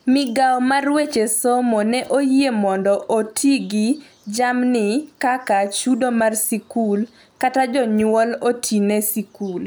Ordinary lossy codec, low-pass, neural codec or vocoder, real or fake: none; none; none; real